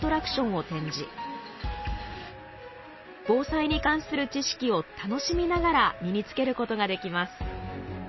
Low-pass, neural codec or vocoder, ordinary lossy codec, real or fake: 7.2 kHz; none; MP3, 24 kbps; real